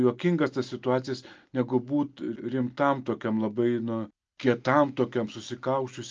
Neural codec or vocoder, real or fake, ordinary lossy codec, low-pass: none; real; Opus, 24 kbps; 7.2 kHz